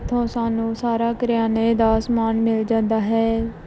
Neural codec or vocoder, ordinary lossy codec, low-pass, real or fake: none; none; none; real